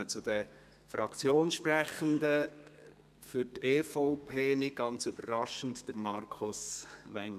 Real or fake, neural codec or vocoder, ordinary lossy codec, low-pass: fake; codec, 32 kHz, 1.9 kbps, SNAC; none; 14.4 kHz